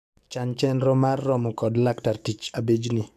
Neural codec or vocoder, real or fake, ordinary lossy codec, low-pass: codec, 44.1 kHz, 7.8 kbps, DAC; fake; none; 14.4 kHz